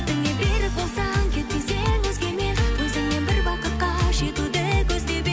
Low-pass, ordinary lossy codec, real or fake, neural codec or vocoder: none; none; real; none